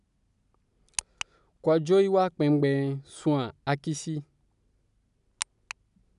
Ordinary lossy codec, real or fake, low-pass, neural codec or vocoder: none; real; 10.8 kHz; none